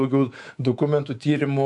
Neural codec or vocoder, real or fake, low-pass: codec, 24 kHz, 3.1 kbps, DualCodec; fake; 10.8 kHz